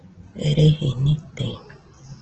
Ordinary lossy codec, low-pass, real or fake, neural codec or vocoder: Opus, 16 kbps; 7.2 kHz; real; none